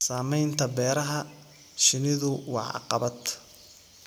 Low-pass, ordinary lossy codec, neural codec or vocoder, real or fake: none; none; none; real